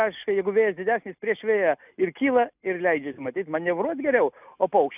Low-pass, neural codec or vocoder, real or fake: 3.6 kHz; none; real